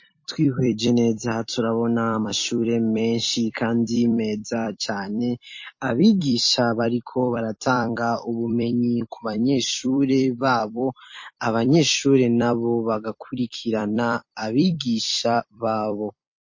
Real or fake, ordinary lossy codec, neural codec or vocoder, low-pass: fake; MP3, 32 kbps; vocoder, 44.1 kHz, 128 mel bands every 256 samples, BigVGAN v2; 7.2 kHz